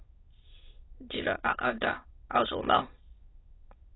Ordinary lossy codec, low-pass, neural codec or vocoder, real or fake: AAC, 16 kbps; 7.2 kHz; autoencoder, 22.05 kHz, a latent of 192 numbers a frame, VITS, trained on many speakers; fake